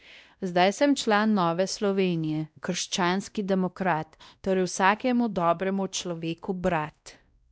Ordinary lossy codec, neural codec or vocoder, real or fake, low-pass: none; codec, 16 kHz, 1 kbps, X-Codec, WavLM features, trained on Multilingual LibriSpeech; fake; none